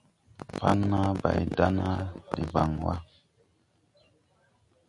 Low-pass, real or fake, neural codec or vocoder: 10.8 kHz; real; none